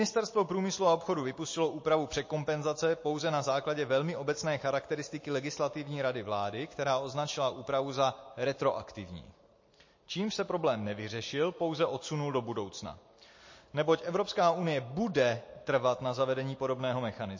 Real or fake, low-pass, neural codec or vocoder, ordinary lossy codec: real; 7.2 kHz; none; MP3, 32 kbps